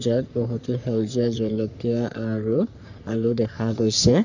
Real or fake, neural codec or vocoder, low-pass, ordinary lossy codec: fake; codec, 44.1 kHz, 3.4 kbps, Pupu-Codec; 7.2 kHz; none